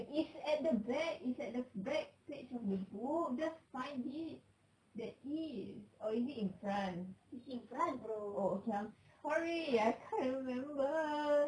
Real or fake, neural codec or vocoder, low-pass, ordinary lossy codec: real; none; 9.9 kHz; none